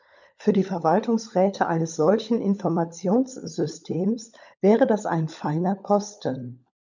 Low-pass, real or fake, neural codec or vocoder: 7.2 kHz; fake; codec, 16 kHz, 16 kbps, FunCodec, trained on LibriTTS, 50 frames a second